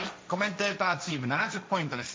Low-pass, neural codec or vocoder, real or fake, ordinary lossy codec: none; codec, 16 kHz, 1.1 kbps, Voila-Tokenizer; fake; none